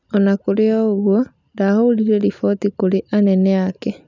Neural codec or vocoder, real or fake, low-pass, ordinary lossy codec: none; real; 7.2 kHz; none